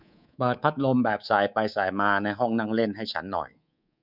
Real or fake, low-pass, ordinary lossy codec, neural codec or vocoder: fake; 5.4 kHz; none; codec, 24 kHz, 3.1 kbps, DualCodec